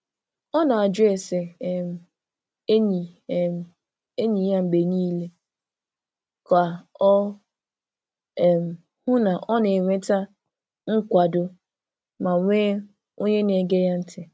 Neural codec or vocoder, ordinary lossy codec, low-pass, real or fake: none; none; none; real